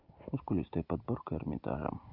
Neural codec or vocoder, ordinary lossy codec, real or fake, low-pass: none; Opus, 24 kbps; real; 5.4 kHz